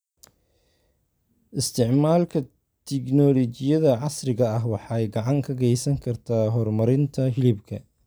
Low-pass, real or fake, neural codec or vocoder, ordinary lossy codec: none; real; none; none